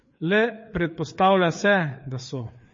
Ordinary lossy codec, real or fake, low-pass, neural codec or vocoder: MP3, 32 kbps; fake; 7.2 kHz; codec, 16 kHz, 4 kbps, FreqCodec, larger model